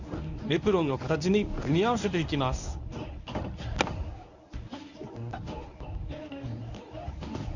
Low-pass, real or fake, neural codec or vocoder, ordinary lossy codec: 7.2 kHz; fake; codec, 24 kHz, 0.9 kbps, WavTokenizer, medium speech release version 1; none